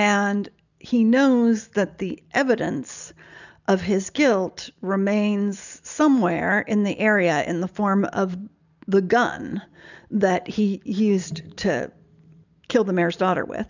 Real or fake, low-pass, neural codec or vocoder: real; 7.2 kHz; none